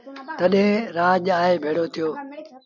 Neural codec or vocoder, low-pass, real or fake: none; 7.2 kHz; real